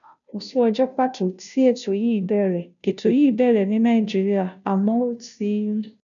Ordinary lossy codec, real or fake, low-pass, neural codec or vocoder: none; fake; 7.2 kHz; codec, 16 kHz, 0.5 kbps, FunCodec, trained on Chinese and English, 25 frames a second